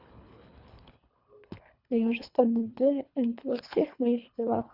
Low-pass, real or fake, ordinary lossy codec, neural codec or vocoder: 5.4 kHz; fake; none; codec, 24 kHz, 3 kbps, HILCodec